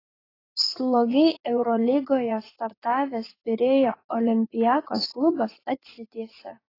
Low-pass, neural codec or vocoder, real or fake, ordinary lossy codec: 5.4 kHz; vocoder, 44.1 kHz, 80 mel bands, Vocos; fake; AAC, 24 kbps